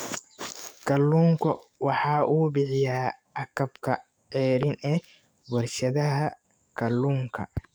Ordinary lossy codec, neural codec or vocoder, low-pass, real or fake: none; codec, 44.1 kHz, 7.8 kbps, DAC; none; fake